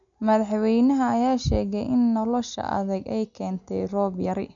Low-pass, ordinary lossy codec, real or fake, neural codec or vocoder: 7.2 kHz; none; real; none